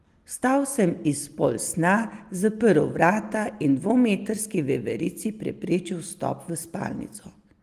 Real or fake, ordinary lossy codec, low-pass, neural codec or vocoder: real; Opus, 24 kbps; 14.4 kHz; none